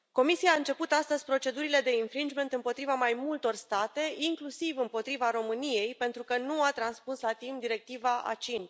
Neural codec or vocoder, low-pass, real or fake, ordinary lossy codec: none; none; real; none